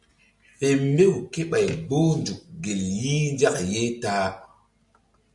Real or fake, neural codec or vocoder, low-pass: real; none; 10.8 kHz